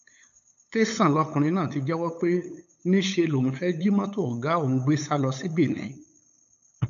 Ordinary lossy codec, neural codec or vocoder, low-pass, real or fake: none; codec, 16 kHz, 8 kbps, FunCodec, trained on LibriTTS, 25 frames a second; 7.2 kHz; fake